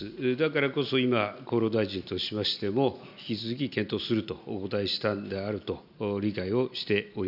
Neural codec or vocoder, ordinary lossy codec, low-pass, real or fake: none; none; 5.4 kHz; real